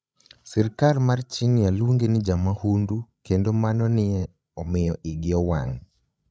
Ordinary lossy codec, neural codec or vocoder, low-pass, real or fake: none; codec, 16 kHz, 16 kbps, FreqCodec, larger model; none; fake